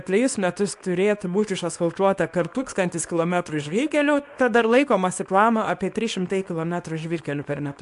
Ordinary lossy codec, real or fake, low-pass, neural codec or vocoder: AAC, 64 kbps; fake; 10.8 kHz; codec, 24 kHz, 0.9 kbps, WavTokenizer, small release